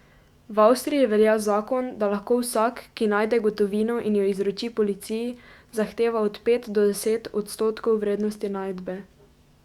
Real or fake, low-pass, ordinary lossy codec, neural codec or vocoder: fake; 19.8 kHz; Opus, 64 kbps; autoencoder, 48 kHz, 128 numbers a frame, DAC-VAE, trained on Japanese speech